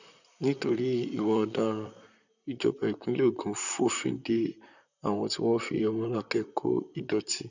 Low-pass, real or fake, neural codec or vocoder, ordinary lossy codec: 7.2 kHz; fake; vocoder, 44.1 kHz, 128 mel bands, Pupu-Vocoder; none